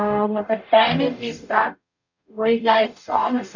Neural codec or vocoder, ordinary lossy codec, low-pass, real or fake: codec, 44.1 kHz, 0.9 kbps, DAC; none; 7.2 kHz; fake